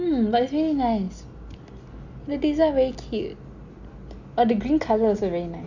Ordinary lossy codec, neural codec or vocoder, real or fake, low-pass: Opus, 64 kbps; none; real; 7.2 kHz